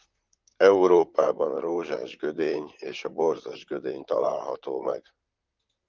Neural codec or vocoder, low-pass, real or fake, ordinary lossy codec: vocoder, 44.1 kHz, 128 mel bands, Pupu-Vocoder; 7.2 kHz; fake; Opus, 24 kbps